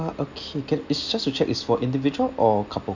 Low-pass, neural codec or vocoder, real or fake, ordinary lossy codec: 7.2 kHz; none; real; none